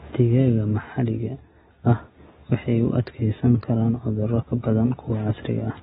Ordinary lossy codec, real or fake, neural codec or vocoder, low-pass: AAC, 16 kbps; real; none; 7.2 kHz